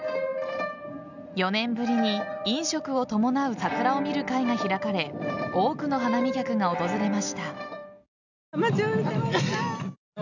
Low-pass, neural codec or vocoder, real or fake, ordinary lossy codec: 7.2 kHz; none; real; none